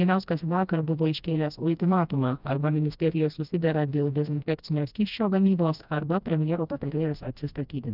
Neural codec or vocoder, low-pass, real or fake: codec, 16 kHz, 1 kbps, FreqCodec, smaller model; 5.4 kHz; fake